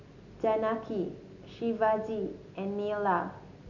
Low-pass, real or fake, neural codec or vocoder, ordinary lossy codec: 7.2 kHz; real; none; none